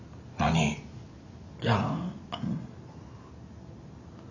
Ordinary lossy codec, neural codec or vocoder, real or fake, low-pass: none; none; real; 7.2 kHz